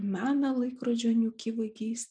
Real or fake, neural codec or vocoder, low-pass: real; none; 9.9 kHz